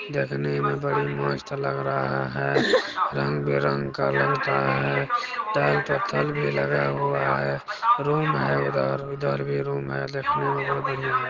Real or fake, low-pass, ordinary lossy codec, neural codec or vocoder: real; 7.2 kHz; Opus, 16 kbps; none